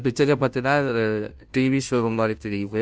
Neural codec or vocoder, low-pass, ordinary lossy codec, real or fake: codec, 16 kHz, 0.5 kbps, FunCodec, trained on Chinese and English, 25 frames a second; none; none; fake